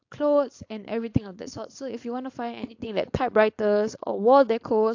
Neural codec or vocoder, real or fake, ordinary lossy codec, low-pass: codec, 16 kHz, 4.8 kbps, FACodec; fake; AAC, 48 kbps; 7.2 kHz